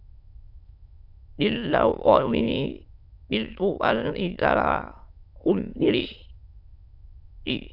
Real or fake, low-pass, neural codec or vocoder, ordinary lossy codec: fake; 5.4 kHz; autoencoder, 22.05 kHz, a latent of 192 numbers a frame, VITS, trained on many speakers; AAC, 48 kbps